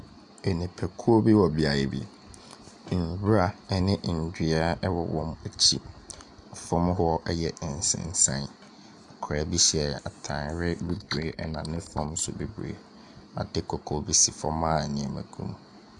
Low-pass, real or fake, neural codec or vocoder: 10.8 kHz; real; none